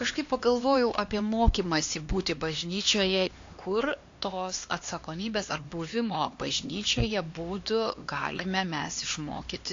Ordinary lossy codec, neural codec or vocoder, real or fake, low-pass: AAC, 48 kbps; codec, 16 kHz, 2 kbps, X-Codec, HuBERT features, trained on LibriSpeech; fake; 7.2 kHz